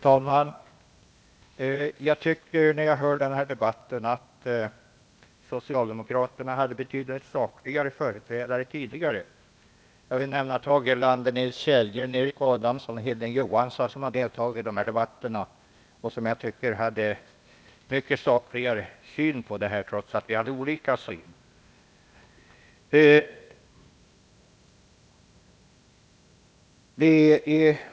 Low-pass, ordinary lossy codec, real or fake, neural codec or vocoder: none; none; fake; codec, 16 kHz, 0.8 kbps, ZipCodec